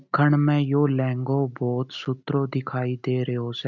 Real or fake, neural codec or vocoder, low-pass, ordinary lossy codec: real; none; 7.2 kHz; none